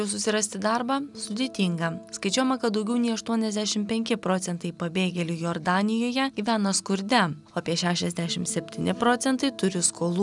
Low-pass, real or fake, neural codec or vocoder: 10.8 kHz; real; none